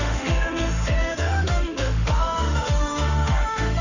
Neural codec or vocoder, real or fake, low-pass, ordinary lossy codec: autoencoder, 48 kHz, 32 numbers a frame, DAC-VAE, trained on Japanese speech; fake; 7.2 kHz; none